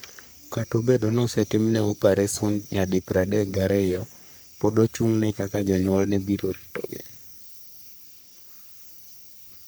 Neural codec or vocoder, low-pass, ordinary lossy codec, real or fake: codec, 44.1 kHz, 3.4 kbps, Pupu-Codec; none; none; fake